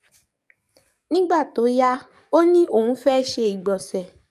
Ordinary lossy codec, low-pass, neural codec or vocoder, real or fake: none; 14.4 kHz; codec, 44.1 kHz, 7.8 kbps, DAC; fake